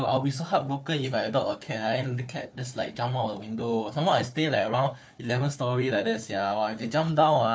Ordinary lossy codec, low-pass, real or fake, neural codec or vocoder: none; none; fake; codec, 16 kHz, 4 kbps, FunCodec, trained on Chinese and English, 50 frames a second